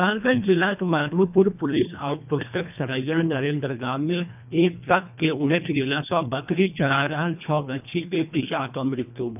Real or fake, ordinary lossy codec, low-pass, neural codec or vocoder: fake; none; 3.6 kHz; codec, 24 kHz, 1.5 kbps, HILCodec